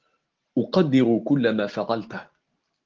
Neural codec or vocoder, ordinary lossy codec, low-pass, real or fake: none; Opus, 16 kbps; 7.2 kHz; real